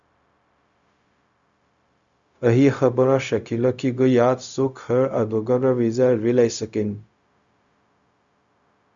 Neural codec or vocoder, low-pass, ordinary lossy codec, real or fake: codec, 16 kHz, 0.4 kbps, LongCat-Audio-Codec; 7.2 kHz; Opus, 64 kbps; fake